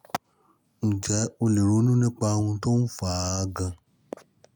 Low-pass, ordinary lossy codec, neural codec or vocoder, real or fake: none; none; none; real